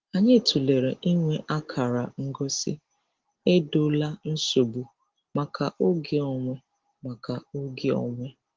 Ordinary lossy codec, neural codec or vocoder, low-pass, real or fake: Opus, 16 kbps; none; 7.2 kHz; real